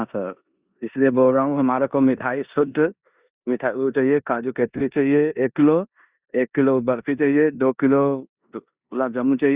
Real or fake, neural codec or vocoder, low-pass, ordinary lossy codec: fake; codec, 16 kHz in and 24 kHz out, 0.9 kbps, LongCat-Audio-Codec, four codebook decoder; 3.6 kHz; Opus, 64 kbps